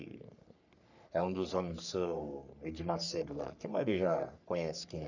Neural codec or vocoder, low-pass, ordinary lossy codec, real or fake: codec, 44.1 kHz, 3.4 kbps, Pupu-Codec; 7.2 kHz; none; fake